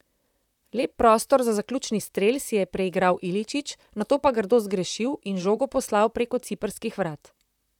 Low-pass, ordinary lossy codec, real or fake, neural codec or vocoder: 19.8 kHz; none; fake; vocoder, 44.1 kHz, 128 mel bands, Pupu-Vocoder